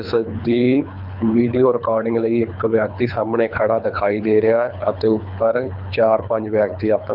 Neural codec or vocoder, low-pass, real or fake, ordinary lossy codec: codec, 24 kHz, 3 kbps, HILCodec; 5.4 kHz; fake; none